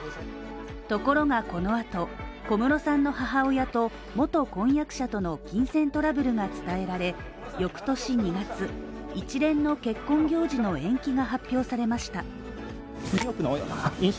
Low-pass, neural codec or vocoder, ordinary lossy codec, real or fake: none; none; none; real